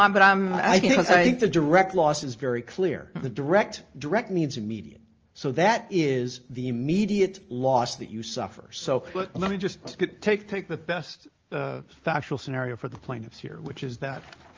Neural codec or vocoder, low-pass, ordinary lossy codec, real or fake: none; 7.2 kHz; Opus, 24 kbps; real